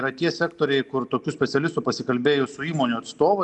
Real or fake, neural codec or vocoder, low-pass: real; none; 10.8 kHz